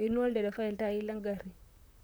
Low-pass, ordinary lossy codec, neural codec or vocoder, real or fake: none; none; vocoder, 44.1 kHz, 128 mel bands, Pupu-Vocoder; fake